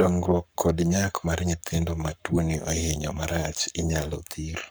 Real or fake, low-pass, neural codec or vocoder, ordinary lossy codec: fake; none; codec, 44.1 kHz, 7.8 kbps, Pupu-Codec; none